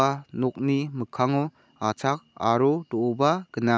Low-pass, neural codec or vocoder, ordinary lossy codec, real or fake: none; none; none; real